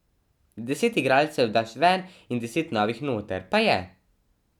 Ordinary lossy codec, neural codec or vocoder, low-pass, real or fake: none; none; 19.8 kHz; real